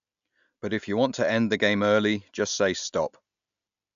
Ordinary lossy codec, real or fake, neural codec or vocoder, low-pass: AAC, 96 kbps; real; none; 7.2 kHz